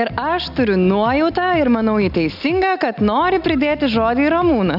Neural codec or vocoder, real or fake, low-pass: none; real; 5.4 kHz